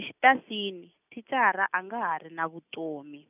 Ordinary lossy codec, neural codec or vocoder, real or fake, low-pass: none; none; real; 3.6 kHz